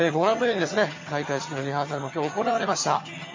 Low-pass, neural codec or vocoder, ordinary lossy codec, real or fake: 7.2 kHz; vocoder, 22.05 kHz, 80 mel bands, HiFi-GAN; MP3, 32 kbps; fake